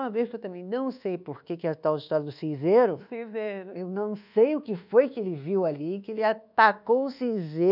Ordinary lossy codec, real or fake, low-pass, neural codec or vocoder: none; fake; 5.4 kHz; codec, 24 kHz, 1.2 kbps, DualCodec